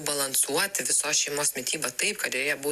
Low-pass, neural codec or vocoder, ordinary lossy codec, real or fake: 14.4 kHz; none; Opus, 64 kbps; real